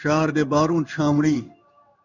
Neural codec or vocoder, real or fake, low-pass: codec, 16 kHz in and 24 kHz out, 1 kbps, XY-Tokenizer; fake; 7.2 kHz